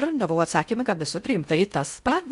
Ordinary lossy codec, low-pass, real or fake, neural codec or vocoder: Opus, 64 kbps; 10.8 kHz; fake; codec, 16 kHz in and 24 kHz out, 0.6 kbps, FocalCodec, streaming, 2048 codes